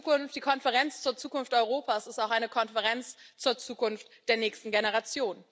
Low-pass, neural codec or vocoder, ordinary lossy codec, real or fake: none; none; none; real